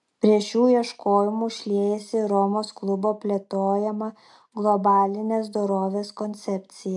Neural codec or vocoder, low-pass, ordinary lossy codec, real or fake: none; 10.8 kHz; AAC, 64 kbps; real